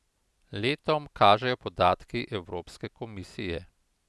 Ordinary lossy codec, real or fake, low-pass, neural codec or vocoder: none; real; none; none